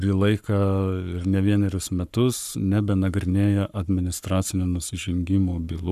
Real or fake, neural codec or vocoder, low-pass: fake; codec, 44.1 kHz, 7.8 kbps, Pupu-Codec; 14.4 kHz